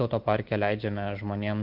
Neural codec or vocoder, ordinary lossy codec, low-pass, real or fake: none; Opus, 32 kbps; 5.4 kHz; real